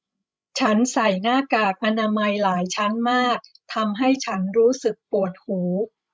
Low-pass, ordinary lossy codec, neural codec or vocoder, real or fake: none; none; codec, 16 kHz, 16 kbps, FreqCodec, larger model; fake